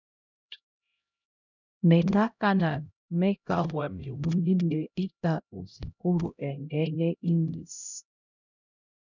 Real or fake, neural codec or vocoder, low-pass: fake; codec, 16 kHz, 0.5 kbps, X-Codec, HuBERT features, trained on LibriSpeech; 7.2 kHz